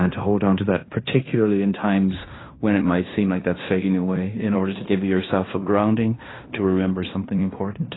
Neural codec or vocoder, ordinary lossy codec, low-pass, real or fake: codec, 16 kHz in and 24 kHz out, 0.9 kbps, LongCat-Audio-Codec, fine tuned four codebook decoder; AAC, 16 kbps; 7.2 kHz; fake